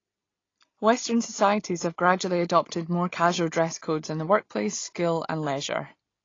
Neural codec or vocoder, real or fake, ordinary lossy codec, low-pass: none; real; AAC, 32 kbps; 7.2 kHz